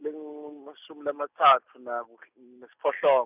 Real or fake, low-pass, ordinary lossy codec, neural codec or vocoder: real; 3.6 kHz; none; none